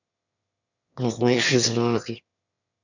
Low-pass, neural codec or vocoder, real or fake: 7.2 kHz; autoencoder, 22.05 kHz, a latent of 192 numbers a frame, VITS, trained on one speaker; fake